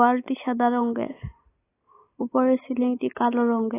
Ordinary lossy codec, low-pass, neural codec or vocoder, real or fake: AAC, 16 kbps; 3.6 kHz; none; real